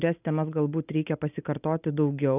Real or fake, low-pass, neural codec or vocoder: real; 3.6 kHz; none